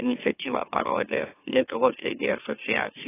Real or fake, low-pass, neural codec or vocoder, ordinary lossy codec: fake; 3.6 kHz; autoencoder, 44.1 kHz, a latent of 192 numbers a frame, MeloTTS; AAC, 16 kbps